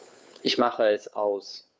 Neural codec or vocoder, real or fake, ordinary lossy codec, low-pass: codec, 16 kHz, 2 kbps, FunCodec, trained on Chinese and English, 25 frames a second; fake; none; none